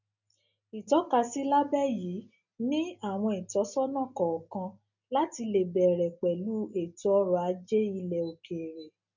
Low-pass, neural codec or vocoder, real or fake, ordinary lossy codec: 7.2 kHz; none; real; none